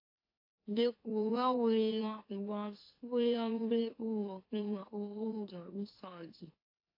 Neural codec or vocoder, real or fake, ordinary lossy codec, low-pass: autoencoder, 44.1 kHz, a latent of 192 numbers a frame, MeloTTS; fake; AAC, 32 kbps; 5.4 kHz